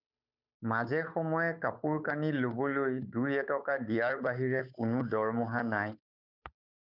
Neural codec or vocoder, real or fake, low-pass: codec, 16 kHz, 8 kbps, FunCodec, trained on Chinese and English, 25 frames a second; fake; 5.4 kHz